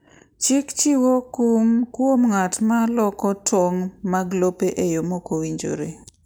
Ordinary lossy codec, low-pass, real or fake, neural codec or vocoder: none; none; real; none